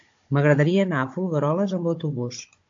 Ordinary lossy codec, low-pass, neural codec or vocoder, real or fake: AAC, 48 kbps; 7.2 kHz; codec, 16 kHz, 16 kbps, FunCodec, trained on Chinese and English, 50 frames a second; fake